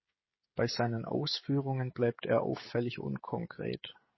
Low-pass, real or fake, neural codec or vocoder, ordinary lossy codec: 7.2 kHz; fake; codec, 16 kHz, 16 kbps, FreqCodec, smaller model; MP3, 24 kbps